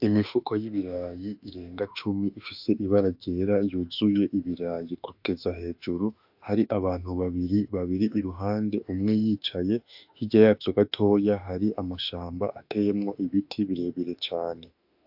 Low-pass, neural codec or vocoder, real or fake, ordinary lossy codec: 5.4 kHz; autoencoder, 48 kHz, 32 numbers a frame, DAC-VAE, trained on Japanese speech; fake; AAC, 48 kbps